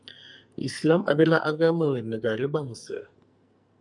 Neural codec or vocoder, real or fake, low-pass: codec, 44.1 kHz, 2.6 kbps, SNAC; fake; 10.8 kHz